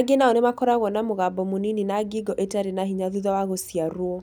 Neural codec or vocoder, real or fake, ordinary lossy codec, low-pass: none; real; none; none